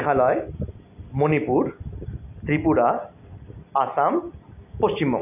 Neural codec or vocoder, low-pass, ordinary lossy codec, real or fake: none; 3.6 kHz; none; real